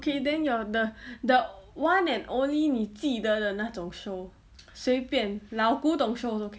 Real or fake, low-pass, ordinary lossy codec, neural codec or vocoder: real; none; none; none